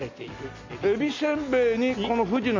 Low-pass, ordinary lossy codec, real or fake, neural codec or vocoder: 7.2 kHz; MP3, 64 kbps; real; none